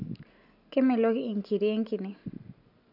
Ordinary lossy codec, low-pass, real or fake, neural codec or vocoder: none; 5.4 kHz; real; none